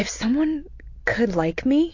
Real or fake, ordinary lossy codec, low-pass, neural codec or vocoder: real; AAC, 48 kbps; 7.2 kHz; none